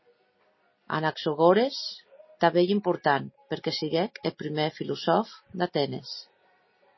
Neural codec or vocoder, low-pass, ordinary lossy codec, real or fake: none; 7.2 kHz; MP3, 24 kbps; real